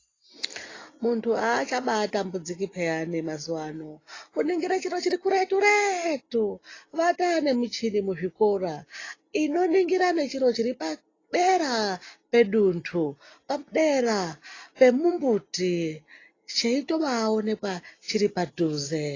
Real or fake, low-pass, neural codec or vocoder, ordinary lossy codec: real; 7.2 kHz; none; AAC, 32 kbps